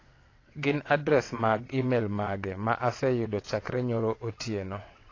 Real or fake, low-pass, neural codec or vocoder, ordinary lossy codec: fake; 7.2 kHz; vocoder, 22.05 kHz, 80 mel bands, WaveNeXt; AAC, 32 kbps